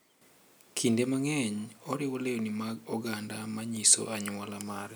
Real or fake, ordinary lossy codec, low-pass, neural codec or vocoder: real; none; none; none